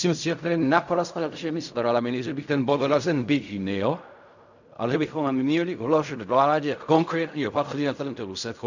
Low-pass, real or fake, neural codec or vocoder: 7.2 kHz; fake; codec, 16 kHz in and 24 kHz out, 0.4 kbps, LongCat-Audio-Codec, fine tuned four codebook decoder